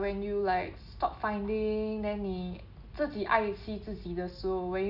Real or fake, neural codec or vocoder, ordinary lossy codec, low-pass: real; none; none; 5.4 kHz